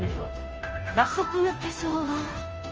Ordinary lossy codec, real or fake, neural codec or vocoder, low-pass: Opus, 24 kbps; fake; codec, 16 kHz, 0.5 kbps, FunCodec, trained on Chinese and English, 25 frames a second; 7.2 kHz